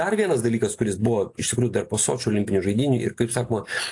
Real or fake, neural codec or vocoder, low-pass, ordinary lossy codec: real; none; 10.8 kHz; AAC, 48 kbps